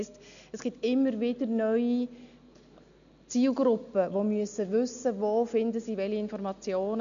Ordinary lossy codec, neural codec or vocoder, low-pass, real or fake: none; none; 7.2 kHz; real